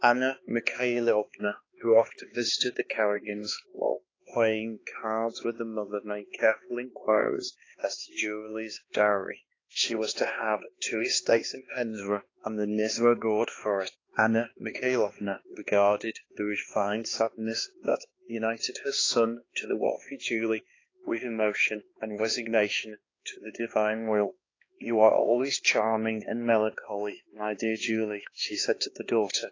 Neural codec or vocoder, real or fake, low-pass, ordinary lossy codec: codec, 16 kHz, 2 kbps, X-Codec, HuBERT features, trained on balanced general audio; fake; 7.2 kHz; AAC, 32 kbps